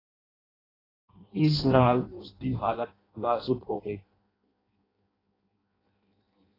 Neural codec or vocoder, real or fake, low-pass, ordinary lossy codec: codec, 16 kHz in and 24 kHz out, 0.6 kbps, FireRedTTS-2 codec; fake; 5.4 kHz; AAC, 24 kbps